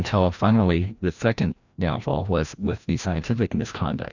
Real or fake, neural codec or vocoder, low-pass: fake; codec, 16 kHz, 1 kbps, FreqCodec, larger model; 7.2 kHz